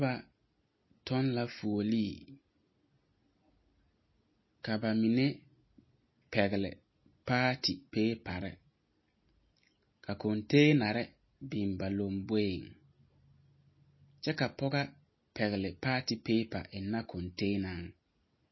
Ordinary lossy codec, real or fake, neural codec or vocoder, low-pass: MP3, 24 kbps; real; none; 7.2 kHz